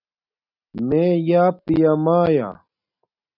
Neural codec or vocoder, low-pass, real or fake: none; 5.4 kHz; real